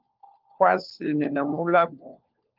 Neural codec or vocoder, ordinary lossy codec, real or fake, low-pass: codec, 16 kHz, 4 kbps, FunCodec, trained on LibriTTS, 50 frames a second; Opus, 32 kbps; fake; 5.4 kHz